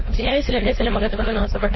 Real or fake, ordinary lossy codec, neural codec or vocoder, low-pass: fake; MP3, 24 kbps; codec, 16 kHz, 4.8 kbps, FACodec; 7.2 kHz